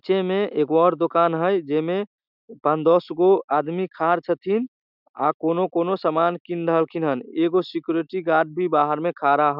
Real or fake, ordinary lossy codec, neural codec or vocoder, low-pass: real; none; none; 5.4 kHz